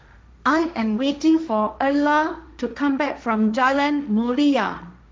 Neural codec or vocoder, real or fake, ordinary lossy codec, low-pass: codec, 16 kHz, 1.1 kbps, Voila-Tokenizer; fake; none; none